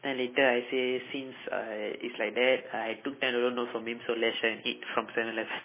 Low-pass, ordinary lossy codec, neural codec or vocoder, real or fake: 3.6 kHz; MP3, 16 kbps; none; real